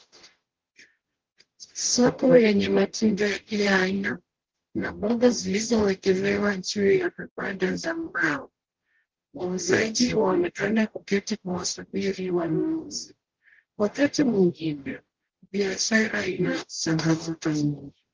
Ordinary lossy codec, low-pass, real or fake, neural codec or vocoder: Opus, 16 kbps; 7.2 kHz; fake; codec, 44.1 kHz, 0.9 kbps, DAC